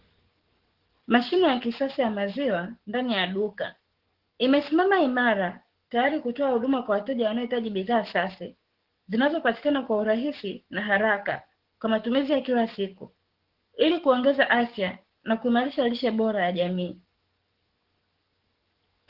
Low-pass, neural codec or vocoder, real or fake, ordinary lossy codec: 5.4 kHz; codec, 44.1 kHz, 7.8 kbps, DAC; fake; Opus, 16 kbps